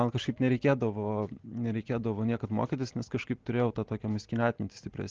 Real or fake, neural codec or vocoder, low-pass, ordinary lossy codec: real; none; 7.2 kHz; Opus, 16 kbps